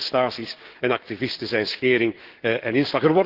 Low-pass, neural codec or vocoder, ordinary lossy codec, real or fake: 5.4 kHz; codec, 16 kHz, 6 kbps, DAC; Opus, 16 kbps; fake